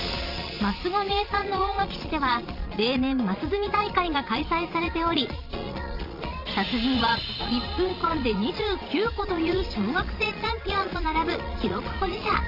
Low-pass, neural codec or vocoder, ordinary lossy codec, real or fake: 5.4 kHz; vocoder, 22.05 kHz, 80 mel bands, Vocos; none; fake